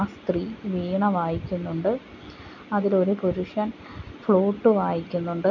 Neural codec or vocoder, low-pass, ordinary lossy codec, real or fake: none; 7.2 kHz; none; real